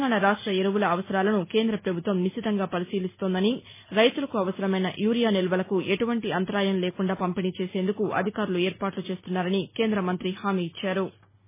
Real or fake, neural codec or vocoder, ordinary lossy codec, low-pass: real; none; MP3, 16 kbps; 3.6 kHz